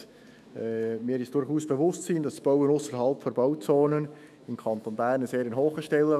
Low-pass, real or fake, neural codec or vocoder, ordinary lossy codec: 14.4 kHz; fake; autoencoder, 48 kHz, 128 numbers a frame, DAC-VAE, trained on Japanese speech; none